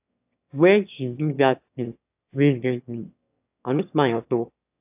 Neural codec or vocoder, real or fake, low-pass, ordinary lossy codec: autoencoder, 22.05 kHz, a latent of 192 numbers a frame, VITS, trained on one speaker; fake; 3.6 kHz; AAC, 32 kbps